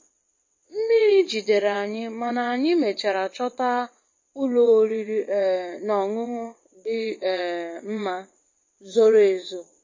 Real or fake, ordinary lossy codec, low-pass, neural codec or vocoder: fake; MP3, 32 kbps; 7.2 kHz; vocoder, 22.05 kHz, 80 mel bands, WaveNeXt